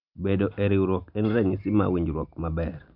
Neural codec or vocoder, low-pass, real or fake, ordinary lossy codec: none; 5.4 kHz; real; none